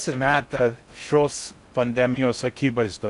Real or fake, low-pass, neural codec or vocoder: fake; 10.8 kHz; codec, 16 kHz in and 24 kHz out, 0.6 kbps, FocalCodec, streaming, 4096 codes